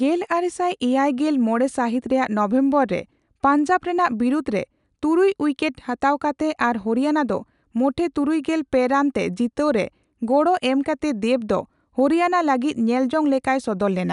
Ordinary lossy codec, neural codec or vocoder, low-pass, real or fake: none; none; 10.8 kHz; real